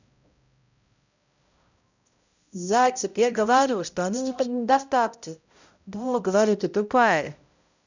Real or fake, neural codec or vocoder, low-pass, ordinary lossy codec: fake; codec, 16 kHz, 0.5 kbps, X-Codec, HuBERT features, trained on balanced general audio; 7.2 kHz; none